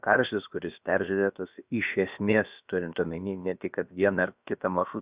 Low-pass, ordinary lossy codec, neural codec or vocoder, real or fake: 3.6 kHz; Opus, 64 kbps; codec, 16 kHz, about 1 kbps, DyCAST, with the encoder's durations; fake